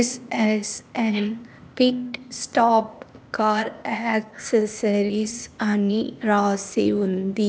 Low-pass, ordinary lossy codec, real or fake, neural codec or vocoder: none; none; fake; codec, 16 kHz, 0.8 kbps, ZipCodec